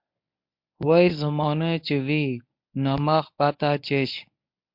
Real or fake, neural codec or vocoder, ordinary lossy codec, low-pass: fake; codec, 24 kHz, 0.9 kbps, WavTokenizer, medium speech release version 1; MP3, 48 kbps; 5.4 kHz